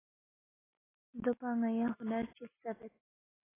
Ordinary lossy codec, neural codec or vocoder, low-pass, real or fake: AAC, 16 kbps; none; 3.6 kHz; real